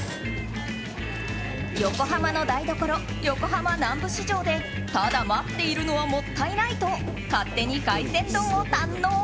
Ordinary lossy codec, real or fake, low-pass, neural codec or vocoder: none; real; none; none